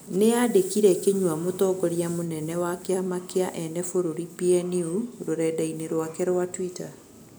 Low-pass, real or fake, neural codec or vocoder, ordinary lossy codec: none; real; none; none